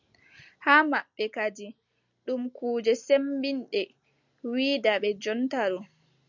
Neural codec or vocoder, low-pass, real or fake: none; 7.2 kHz; real